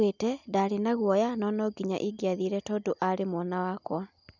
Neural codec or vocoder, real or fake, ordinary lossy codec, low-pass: none; real; none; 7.2 kHz